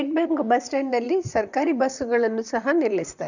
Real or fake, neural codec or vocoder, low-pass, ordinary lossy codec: fake; vocoder, 44.1 kHz, 128 mel bands, Pupu-Vocoder; 7.2 kHz; none